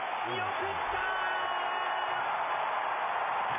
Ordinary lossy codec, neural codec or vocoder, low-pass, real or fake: AAC, 24 kbps; none; 3.6 kHz; real